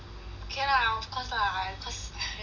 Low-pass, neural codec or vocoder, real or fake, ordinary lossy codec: 7.2 kHz; none; real; none